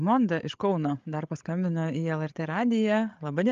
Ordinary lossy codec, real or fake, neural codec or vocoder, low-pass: Opus, 32 kbps; fake; codec, 16 kHz, 8 kbps, FreqCodec, larger model; 7.2 kHz